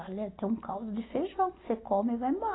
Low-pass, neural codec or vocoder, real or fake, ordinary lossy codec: 7.2 kHz; none; real; AAC, 16 kbps